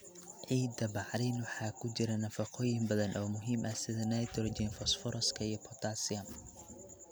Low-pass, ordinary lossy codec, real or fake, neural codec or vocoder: none; none; real; none